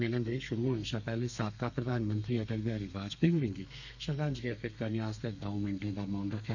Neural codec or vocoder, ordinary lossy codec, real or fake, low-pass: codec, 32 kHz, 1.9 kbps, SNAC; none; fake; 7.2 kHz